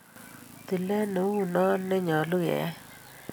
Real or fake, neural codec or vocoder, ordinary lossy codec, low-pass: real; none; none; none